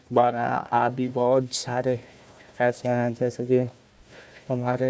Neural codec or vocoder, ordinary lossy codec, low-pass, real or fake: codec, 16 kHz, 1 kbps, FunCodec, trained on Chinese and English, 50 frames a second; none; none; fake